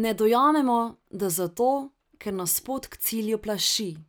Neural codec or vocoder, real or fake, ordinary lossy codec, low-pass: none; real; none; none